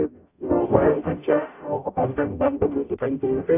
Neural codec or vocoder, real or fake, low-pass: codec, 44.1 kHz, 0.9 kbps, DAC; fake; 3.6 kHz